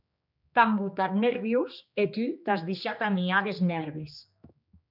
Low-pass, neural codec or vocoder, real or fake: 5.4 kHz; codec, 16 kHz, 2 kbps, X-Codec, HuBERT features, trained on general audio; fake